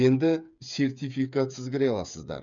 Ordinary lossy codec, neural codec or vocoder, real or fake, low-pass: none; codec, 16 kHz, 8 kbps, FreqCodec, smaller model; fake; 7.2 kHz